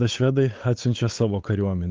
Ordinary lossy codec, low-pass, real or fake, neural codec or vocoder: Opus, 32 kbps; 7.2 kHz; fake; codec, 16 kHz, 8 kbps, FunCodec, trained on Chinese and English, 25 frames a second